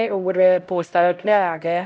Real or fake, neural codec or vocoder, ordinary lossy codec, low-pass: fake; codec, 16 kHz, 0.5 kbps, X-Codec, HuBERT features, trained on LibriSpeech; none; none